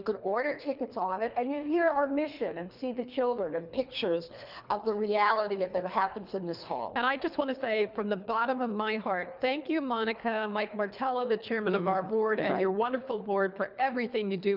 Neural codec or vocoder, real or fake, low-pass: codec, 24 kHz, 3 kbps, HILCodec; fake; 5.4 kHz